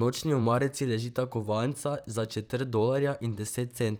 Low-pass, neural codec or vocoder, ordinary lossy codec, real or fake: none; vocoder, 44.1 kHz, 128 mel bands, Pupu-Vocoder; none; fake